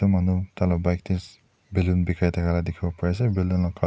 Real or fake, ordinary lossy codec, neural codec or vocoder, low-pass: real; none; none; none